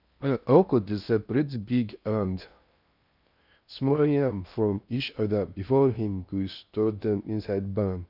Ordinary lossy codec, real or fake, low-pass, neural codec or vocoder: none; fake; 5.4 kHz; codec, 16 kHz in and 24 kHz out, 0.6 kbps, FocalCodec, streaming, 4096 codes